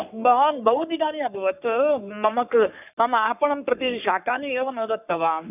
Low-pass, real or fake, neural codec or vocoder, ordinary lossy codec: 3.6 kHz; fake; codec, 44.1 kHz, 3.4 kbps, Pupu-Codec; none